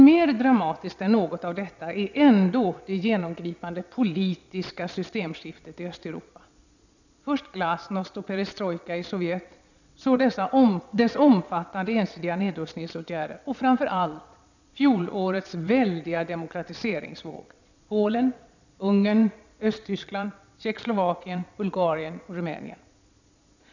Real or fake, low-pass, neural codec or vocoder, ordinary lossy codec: fake; 7.2 kHz; vocoder, 22.05 kHz, 80 mel bands, Vocos; none